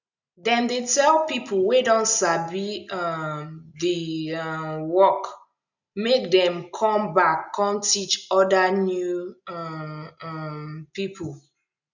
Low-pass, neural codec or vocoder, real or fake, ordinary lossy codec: 7.2 kHz; none; real; none